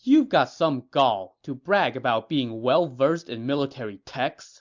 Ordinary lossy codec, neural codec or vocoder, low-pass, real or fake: MP3, 64 kbps; none; 7.2 kHz; real